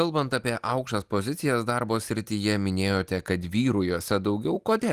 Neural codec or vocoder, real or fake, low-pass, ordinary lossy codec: none; real; 14.4 kHz; Opus, 24 kbps